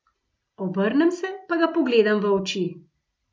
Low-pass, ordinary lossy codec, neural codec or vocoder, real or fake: none; none; none; real